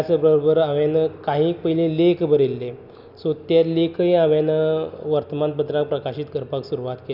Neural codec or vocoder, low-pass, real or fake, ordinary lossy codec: none; 5.4 kHz; real; none